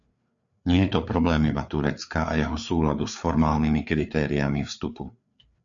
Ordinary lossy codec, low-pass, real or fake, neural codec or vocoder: MP3, 64 kbps; 7.2 kHz; fake; codec, 16 kHz, 4 kbps, FreqCodec, larger model